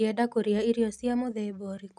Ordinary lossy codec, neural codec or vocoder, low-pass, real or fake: none; none; none; real